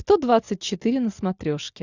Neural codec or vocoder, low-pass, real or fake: none; 7.2 kHz; real